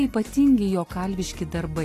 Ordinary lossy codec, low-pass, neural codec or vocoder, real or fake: AAC, 48 kbps; 14.4 kHz; none; real